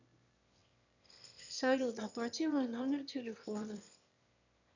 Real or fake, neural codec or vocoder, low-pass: fake; autoencoder, 22.05 kHz, a latent of 192 numbers a frame, VITS, trained on one speaker; 7.2 kHz